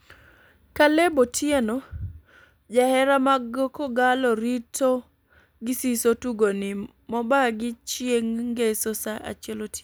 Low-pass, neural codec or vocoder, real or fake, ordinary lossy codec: none; none; real; none